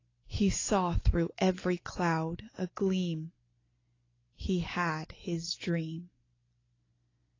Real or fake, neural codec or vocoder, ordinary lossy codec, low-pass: real; none; AAC, 32 kbps; 7.2 kHz